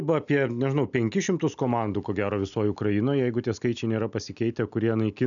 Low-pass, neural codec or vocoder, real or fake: 7.2 kHz; none; real